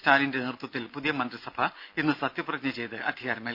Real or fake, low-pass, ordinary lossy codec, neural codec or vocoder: real; 5.4 kHz; none; none